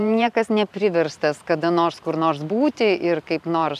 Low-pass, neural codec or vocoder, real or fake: 14.4 kHz; vocoder, 44.1 kHz, 128 mel bands every 512 samples, BigVGAN v2; fake